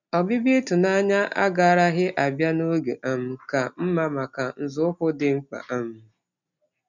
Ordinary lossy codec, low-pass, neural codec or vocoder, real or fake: none; 7.2 kHz; none; real